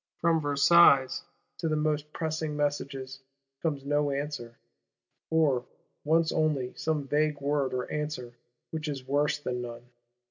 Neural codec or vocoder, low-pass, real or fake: none; 7.2 kHz; real